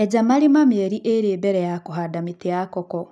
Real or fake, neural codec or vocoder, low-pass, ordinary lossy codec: real; none; none; none